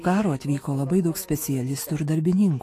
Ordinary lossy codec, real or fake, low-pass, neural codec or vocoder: AAC, 48 kbps; fake; 14.4 kHz; autoencoder, 48 kHz, 128 numbers a frame, DAC-VAE, trained on Japanese speech